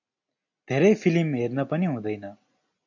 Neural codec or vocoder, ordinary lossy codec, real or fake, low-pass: none; AAC, 48 kbps; real; 7.2 kHz